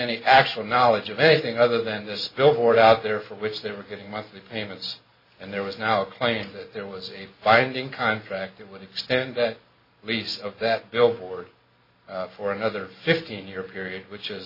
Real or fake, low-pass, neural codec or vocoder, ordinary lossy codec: real; 5.4 kHz; none; MP3, 24 kbps